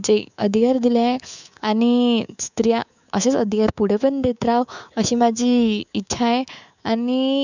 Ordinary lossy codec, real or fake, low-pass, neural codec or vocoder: none; fake; 7.2 kHz; codec, 24 kHz, 3.1 kbps, DualCodec